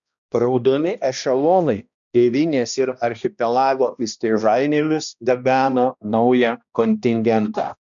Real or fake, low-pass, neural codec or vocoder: fake; 7.2 kHz; codec, 16 kHz, 1 kbps, X-Codec, HuBERT features, trained on balanced general audio